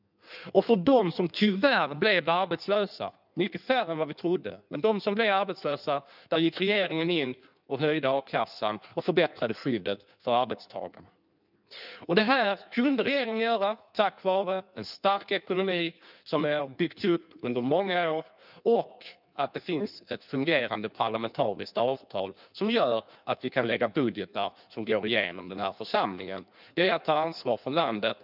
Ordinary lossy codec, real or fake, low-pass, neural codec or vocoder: none; fake; 5.4 kHz; codec, 16 kHz in and 24 kHz out, 1.1 kbps, FireRedTTS-2 codec